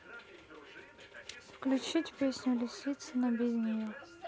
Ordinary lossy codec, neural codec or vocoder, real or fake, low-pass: none; none; real; none